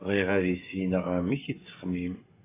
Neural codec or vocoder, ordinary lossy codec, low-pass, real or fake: codec, 16 kHz, 16 kbps, FreqCodec, smaller model; AAC, 16 kbps; 3.6 kHz; fake